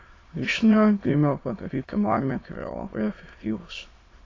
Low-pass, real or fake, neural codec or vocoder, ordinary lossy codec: 7.2 kHz; fake; autoencoder, 22.05 kHz, a latent of 192 numbers a frame, VITS, trained on many speakers; AAC, 32 kbps